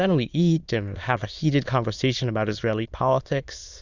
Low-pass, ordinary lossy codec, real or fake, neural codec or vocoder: 7.2 kHz; Opus, 64 kbps; fake; autoencoder, 22.05 kHz, a latent of 192 numbers a frame, VITS, trained on many speakers